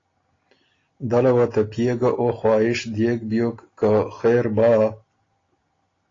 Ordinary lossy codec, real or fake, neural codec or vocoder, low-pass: AAC, 32 kbps; real; none; 7.2 kHz